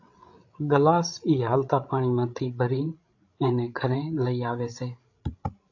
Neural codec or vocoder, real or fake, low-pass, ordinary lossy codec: codec, 16 kHz, 16 kbps, FreqCodec, larger model; fake; 7.2 kHz; AAC, 48 kbps